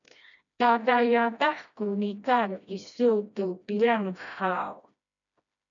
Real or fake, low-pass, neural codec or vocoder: fake; 7.2 kHz; codec, 16 kHz, 1 kbps, FreqCodec, smaller model